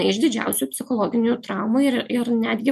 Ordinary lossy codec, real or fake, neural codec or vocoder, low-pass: MP3, 64 kbps; real; none; 14.4 kHz